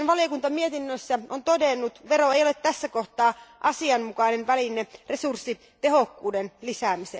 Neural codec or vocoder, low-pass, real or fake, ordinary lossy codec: none; none; real; none